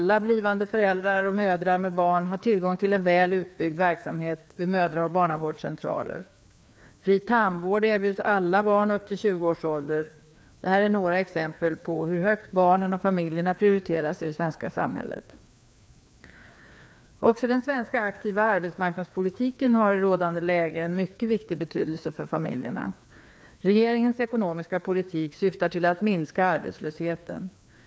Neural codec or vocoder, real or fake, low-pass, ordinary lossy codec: codec, 16 kHz, 2 kbps, FreqCodec, larger model; fake; none; none